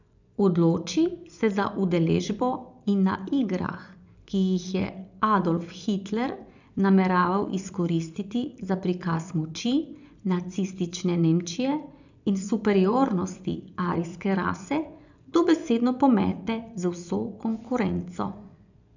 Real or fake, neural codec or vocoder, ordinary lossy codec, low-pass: fake; vocoder, 24 kHz, 100 mel bands, Vocos; none; 7.2 kHz